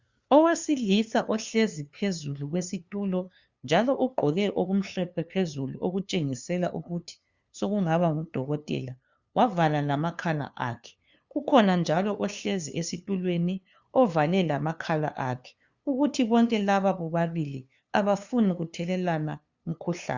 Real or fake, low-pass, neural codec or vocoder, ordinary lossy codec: fake; 7.2 kHz; codec, 16 kHz, 2 kbps, FunCodec, trained on LibriTTS, 25 frames a second; Opus, 64 kbps